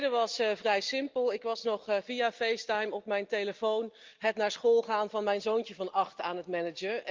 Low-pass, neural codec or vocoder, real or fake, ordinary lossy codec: 7.2 kHz; none; real; Opus, 24 kbps